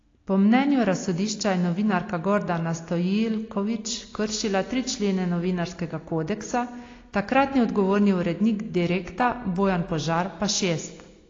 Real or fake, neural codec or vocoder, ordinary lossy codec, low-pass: real; none; AAC, 32 kbps; 7.2 kHz